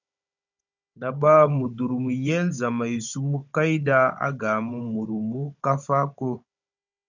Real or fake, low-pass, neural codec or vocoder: fake; 7.2 kHz; codec, 16 kHz, 16 kbps, FunCodec, trained on Chinese and English, 50 frames a second